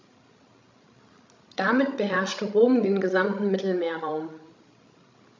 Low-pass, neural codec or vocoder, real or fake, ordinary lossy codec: 7.2 kHz; codec, 16 kHz, 16 kbps, FreqCodec, larger model; fake; MP3, 64 kbps